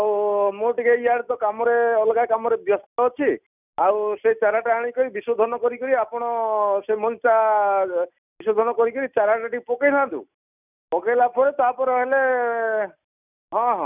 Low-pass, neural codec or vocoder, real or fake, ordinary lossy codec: 3.6 kHz; none; real; none